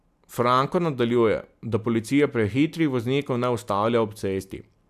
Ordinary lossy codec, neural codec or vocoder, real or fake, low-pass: AAC, 96 kbps; none; real; 14.4 kHz